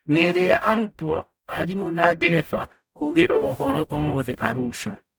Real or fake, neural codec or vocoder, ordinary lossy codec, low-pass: fake; codec, 44.1 kHz, 0.9 kbps, DAC; none; none